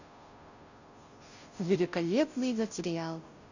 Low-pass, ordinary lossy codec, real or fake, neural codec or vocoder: 7.2 kHz; none; fake; codec, 16 kHz, 0.5 kbps, FunCodec, trained on Chinese and English, 25 frames a second